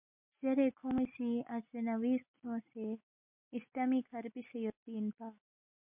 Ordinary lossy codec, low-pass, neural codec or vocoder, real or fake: MP3, 32 kbps; 3.6 kHz; none; real